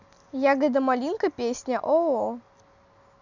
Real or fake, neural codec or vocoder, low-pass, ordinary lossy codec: real; none; 7.2 kHz; none